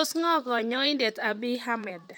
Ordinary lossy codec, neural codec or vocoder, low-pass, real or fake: none; vocoder, 44.1 kHz, 128 mel bands, Pupu-Vocoder; none; fake